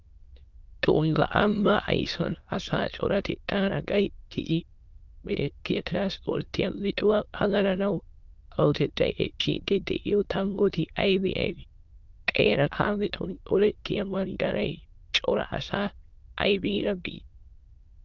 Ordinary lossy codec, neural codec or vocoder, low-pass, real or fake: Opus, 24 kbps; autoencoder, 22.05 kHz, a latent of 192 numbers a frame, VITS, trained on many speakers; 7.2 kHz; fake